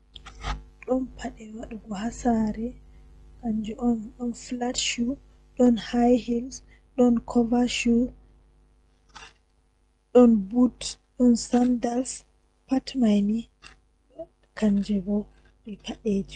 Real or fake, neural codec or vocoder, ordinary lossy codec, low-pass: real; none; Opus, 24 kbps; 10.8 kHz